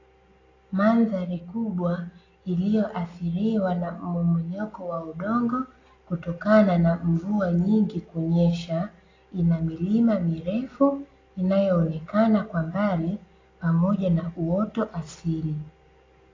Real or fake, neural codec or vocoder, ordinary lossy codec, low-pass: real; none; AAC, 32 kbps; 7.2 kHz